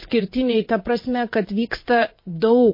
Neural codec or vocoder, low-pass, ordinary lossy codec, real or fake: vocoder, 22.05 kHz, 80 mel bands, WaveNeXt; 5.4 kHz; MP3, 24 kbps; fake